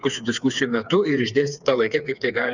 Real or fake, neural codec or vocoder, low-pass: fake; codec, 16 kHz, 4 kbps, FreqCodec, smaller model; 7.2 kHz